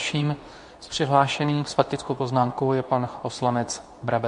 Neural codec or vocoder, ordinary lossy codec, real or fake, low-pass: codec, 24 kHz, 0.9 kbps, WavTokenizer, medium speech release version 2; AAC, 64 kbps; fake; 10.8 kHz